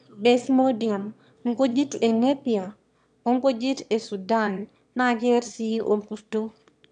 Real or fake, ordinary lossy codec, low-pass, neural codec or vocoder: fake; MP3, 96 kbps; 9.9 kHz; autoencoder, 22.05 kHz, a latent of 192 numbers a frame, VITS, trained on one speaker